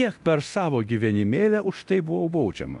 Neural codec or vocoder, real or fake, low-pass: codec, 24 kHz, 0.9 kbps, DualCodec; fake; 10.8 kHz